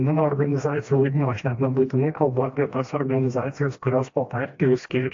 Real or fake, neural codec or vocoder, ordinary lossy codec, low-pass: fake; codec, 16 kHz, 1 kbps, FreqCodec, smaller model; AAC, 48 kbps; 7.2 kHz